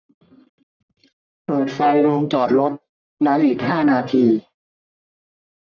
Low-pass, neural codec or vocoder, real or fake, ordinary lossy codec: 7.2 kHz; codec, 44.1 kHz, 1.7 kbps, Pupu-Codec; fake; none